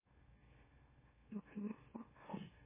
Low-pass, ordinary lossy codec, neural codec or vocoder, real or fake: 3.6 kHz; MP3, 16 kbps; autoencoder, 44.1 kHz, a latent of 192 numbers a frame, MeloTTS; fake